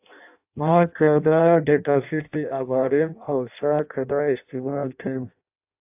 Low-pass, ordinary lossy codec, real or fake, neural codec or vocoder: 3.6 kHz; AAC, 32 kbps; fake; codec, 16 kHz in and 24 kHz out, 0.6 kbps, FireRedTTS-2 codec